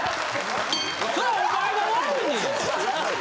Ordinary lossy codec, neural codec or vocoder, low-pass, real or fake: none; none; none; real